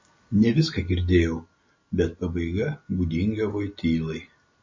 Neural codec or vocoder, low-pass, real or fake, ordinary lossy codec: none; 7.2 kHz; real; MP3, 32 kbps